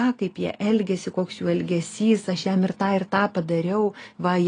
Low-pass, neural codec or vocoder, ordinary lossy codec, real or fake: 9.9 kHz; none; AAC, 32 kbps; real